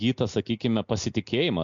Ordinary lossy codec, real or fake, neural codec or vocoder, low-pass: MP3, 64 kbps; real; none; 7.2 kHz